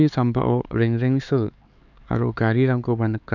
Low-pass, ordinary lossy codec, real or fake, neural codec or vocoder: 7.2 kHz; none; fake; codec, 16 kHz, 4 kbps, X-Codec, HuBERT features, trained on LibriSpeech